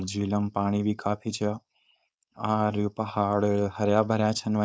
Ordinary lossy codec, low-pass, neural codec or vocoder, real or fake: none; none; codec, 16 kHz, 4.8 kbps, FACodec; fake